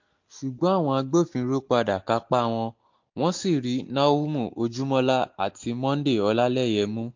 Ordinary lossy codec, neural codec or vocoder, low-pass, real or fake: MP3, 48 kbps; none; 7.2 kHz; real